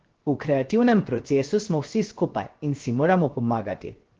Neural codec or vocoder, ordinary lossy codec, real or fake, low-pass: codec, 16 kHz, 0.7 kbps, FocalCodec; Opus, 16 kbps; fake; 7.2 kHz